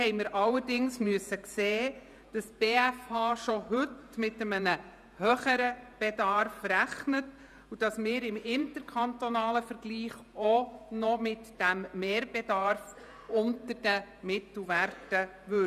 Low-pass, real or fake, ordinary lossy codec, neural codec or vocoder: 14.4 kHz; fake; none; vocoder, 48 kHz, 128 mel bands, Vocos